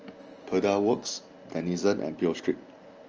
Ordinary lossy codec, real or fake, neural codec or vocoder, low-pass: Opus, 24 kbps; real; none; 7.2 kHz